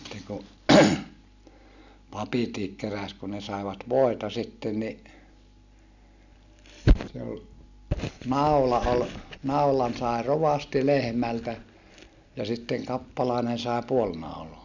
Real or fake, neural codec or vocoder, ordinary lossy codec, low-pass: real; none; none; 7.2 kHz